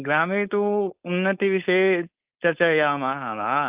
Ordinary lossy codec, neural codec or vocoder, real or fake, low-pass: Opus, 24 kbps; codec, 16 kHz, 4.8 kbps, FACodec; fake; 3.6 kHz